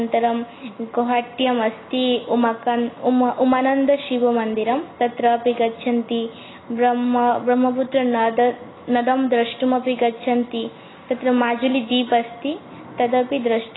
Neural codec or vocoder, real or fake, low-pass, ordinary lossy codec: none; real; 7.2 kHz; AAC, 16 kbps